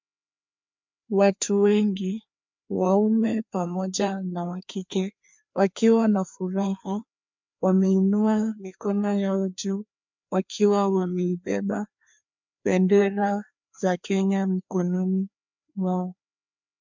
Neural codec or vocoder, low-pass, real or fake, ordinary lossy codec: codec, 16 kHz, 2 kbps, FreqCodec, larger model; 7.2 kHz; fake; MP3, 64 kbps